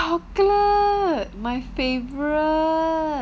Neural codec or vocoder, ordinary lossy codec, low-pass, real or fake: none; none; none; real